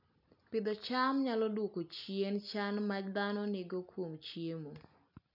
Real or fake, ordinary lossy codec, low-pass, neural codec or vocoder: real; none; 5.4 kHz; none